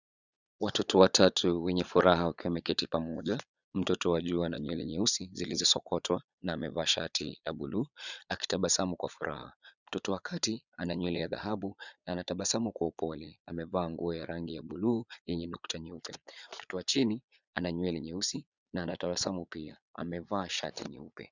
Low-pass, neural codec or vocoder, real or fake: 7.2 kHz; vocoder, 22.05 kHz, 80 mel bands, Vocos; fake